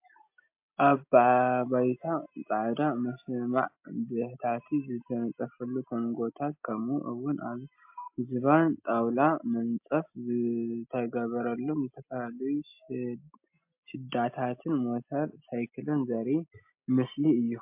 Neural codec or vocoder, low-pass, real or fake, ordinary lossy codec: none; 3.6 kHz; real; MP3, 32 kbps